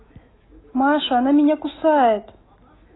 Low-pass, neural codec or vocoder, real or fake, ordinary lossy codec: 7.2 kHz; autoencoder, 48 kHz, 128 numbers a frame, DAC-VAE, trained on Japanese speech; fake; AAC, 16 kbps